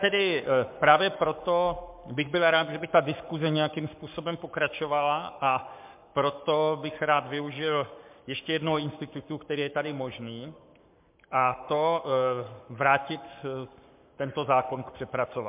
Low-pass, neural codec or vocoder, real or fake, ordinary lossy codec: 3.6 kHz; codec, 44.1 kHz, 7.8 kbps, Pupu-Codec; fake; MP3, 32 kbps